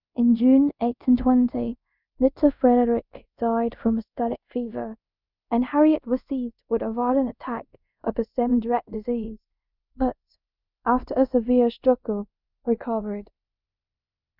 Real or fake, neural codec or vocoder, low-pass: fake; codec, 24 kHz, 0.5 kbps, DualCodec; 5.4 kHz